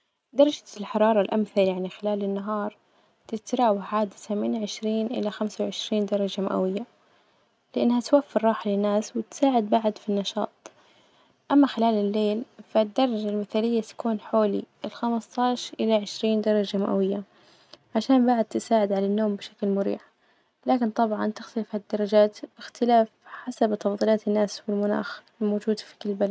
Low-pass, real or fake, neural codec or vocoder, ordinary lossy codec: none; real; none; none